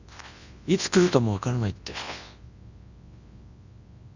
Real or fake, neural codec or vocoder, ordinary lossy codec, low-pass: fake; codec, 24 kHz, 0.9 kbps, WavTokenizer, large speech release; Opus, 64 kbps; 7.2 kHz